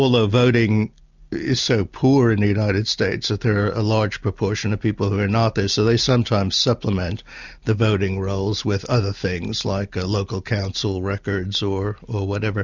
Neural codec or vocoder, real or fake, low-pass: none; real; 7.2 kHz